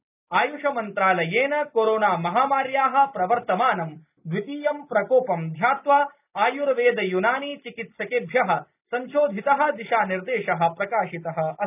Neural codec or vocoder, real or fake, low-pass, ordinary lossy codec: none; real; 3.6 kHz; none